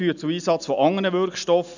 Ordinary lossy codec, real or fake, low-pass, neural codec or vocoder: none; real; 7.2 kHz; none